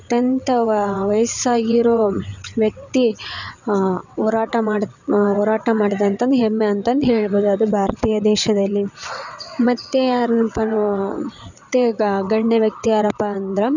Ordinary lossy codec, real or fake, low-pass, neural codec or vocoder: none; fake; 7.2 kHz; vocoder, 22.05 kHz, 80 mel bands, WaveNeXt